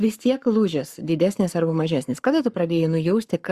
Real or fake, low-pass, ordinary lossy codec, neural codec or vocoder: fake; 14.4 kHz; Opus, 64 kbps; codec, 44.1 kHz, 7.8 kbps, Pupu-Codec